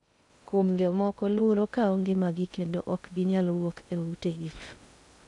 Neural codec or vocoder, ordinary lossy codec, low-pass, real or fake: codec, 16 kHz in and 24 kHz out, 0.6 kbps, FocalCodec, streaming, 2048 codes; none; 10.8 kHz; fake